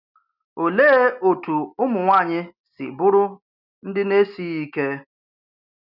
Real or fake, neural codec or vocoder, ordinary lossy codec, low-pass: real; none; none; 5.4 kHz